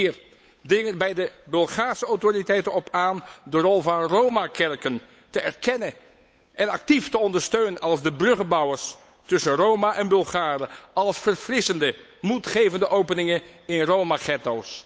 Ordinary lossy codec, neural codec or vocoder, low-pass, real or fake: none; codec, 16 kHz, 8 kbps, FunCodec, trained on Chinese and English, 25 frames a second; none; fake